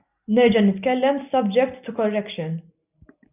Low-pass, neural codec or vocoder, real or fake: 3.6 kHz; none; real